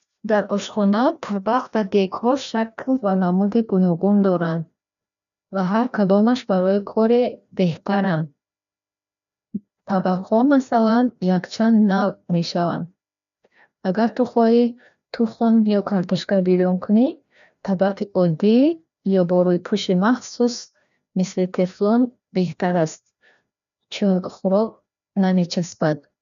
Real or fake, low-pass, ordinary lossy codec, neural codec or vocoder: fake; 7.2 kHz; none; codec, 16 kHz, 1 kbps, FreqCodec, larger model